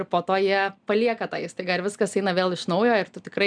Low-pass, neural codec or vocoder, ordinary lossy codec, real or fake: 9.9 kHz; none; MP3, 96 kbps; real